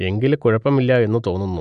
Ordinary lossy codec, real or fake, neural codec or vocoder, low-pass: none; real; none; 9.9 kHz